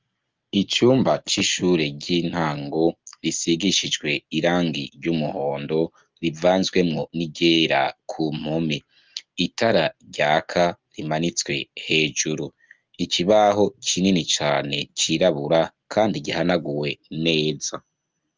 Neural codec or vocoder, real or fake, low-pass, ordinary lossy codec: none; real; 7.2 kHz; Opus, 32 kbps